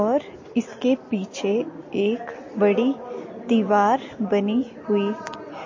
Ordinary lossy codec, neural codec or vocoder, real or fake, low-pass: MP3, 32 kbps; none; real; 7.2 kHz